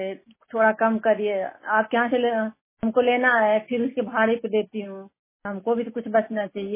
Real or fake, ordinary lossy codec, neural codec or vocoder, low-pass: real; MP3, 16 kbps; none; 3.6 kHz